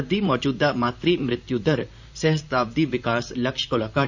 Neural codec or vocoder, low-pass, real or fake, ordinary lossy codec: vocoder, 44.1 kHz, 128 mel bands, Pupu-Vocoder; 7.2 kHz; fake; none